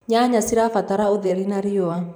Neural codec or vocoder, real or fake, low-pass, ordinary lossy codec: vocoder, 44.1 kHz, 128 mel bands every 512 samples, BigVGAN v2; fake; none; none